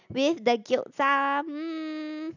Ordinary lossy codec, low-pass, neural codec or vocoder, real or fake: none; 7.2 kHz; none; real